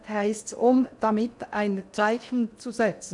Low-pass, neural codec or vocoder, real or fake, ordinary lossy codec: 10.8 kHz; codec, 16 kHz in and 24 kHz out, 0.6 kbps, FocalCodec, streaming, 4096 codes; fake; none